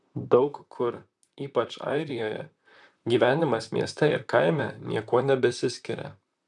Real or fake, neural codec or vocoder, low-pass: fake; vocoder, 44.1 kHz, 128 mel bands, Pupu-Vocoder; 10.8 kHz